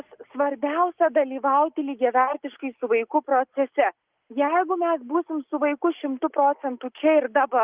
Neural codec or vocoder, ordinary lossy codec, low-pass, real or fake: none; Opus, 32 kbps; 3.6 kHz; real